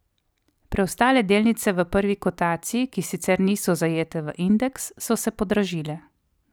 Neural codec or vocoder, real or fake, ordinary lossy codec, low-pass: none; real; none; none